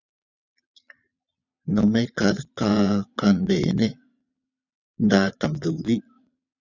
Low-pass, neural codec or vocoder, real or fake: 7.2 kHz; vocoder, 22.05 kHz, 80 mel bands, Vocos; fake